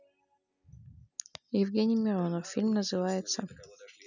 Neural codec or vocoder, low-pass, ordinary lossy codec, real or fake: none; 7.2 kHz; none; real